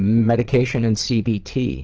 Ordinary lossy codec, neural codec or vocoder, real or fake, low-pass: Opus, 16 kbps; vocoder, 22.05 kHz, 80 mel bands, Vocos; fake; 7.2 kHz